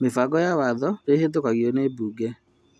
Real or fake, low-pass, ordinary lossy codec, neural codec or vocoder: real; none; none; none